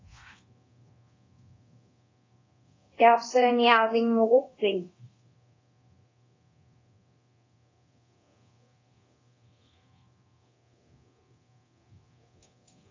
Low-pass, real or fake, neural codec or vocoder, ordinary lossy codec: 7.2 kHz; fake; codec, 24 kHz, 0.9 kbps, DualCodec; AAC, 32 kbps